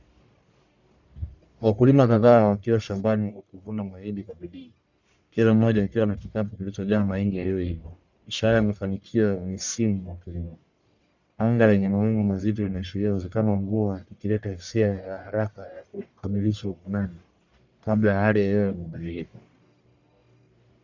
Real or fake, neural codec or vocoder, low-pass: fake; codec, 44.1 kHz, 1.7 kbps, Pupu-Codec; 7.2 kHz